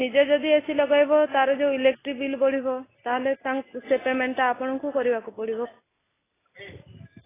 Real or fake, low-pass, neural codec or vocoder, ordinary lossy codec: real; 3.6 kHz; none; AAC, 16 kbps